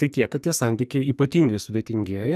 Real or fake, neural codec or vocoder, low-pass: fake; codec, 44.1 kHz, 2.6 kbps, SNAC; 14.4 kHz